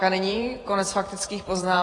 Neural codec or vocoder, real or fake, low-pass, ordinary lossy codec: vocoder, 48 kHz, 128 mel bands, Vocos; fake; 10.8 kHz; AAC, 32 kbps